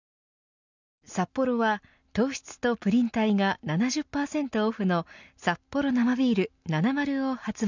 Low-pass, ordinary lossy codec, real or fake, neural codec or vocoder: 7.2 kHz; none; real; none